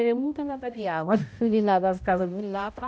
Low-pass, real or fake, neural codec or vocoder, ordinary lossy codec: none; fake; codec, 16 kHz, 0.5 kbps, X-Codec, HuBERT features, trained on balanced general audio; none